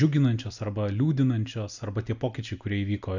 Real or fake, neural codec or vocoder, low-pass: real; none; 7.2 kHz